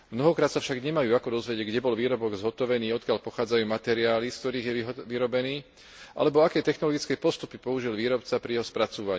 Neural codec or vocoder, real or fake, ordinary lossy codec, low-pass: none; real; none; none